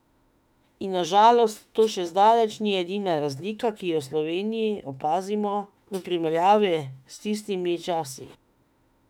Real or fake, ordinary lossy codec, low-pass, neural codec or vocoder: fake; none; 19.8 kHz; autoencoder, 48 kHz, 32 numbers a frame, DAC-VAE, trained on Japanese speech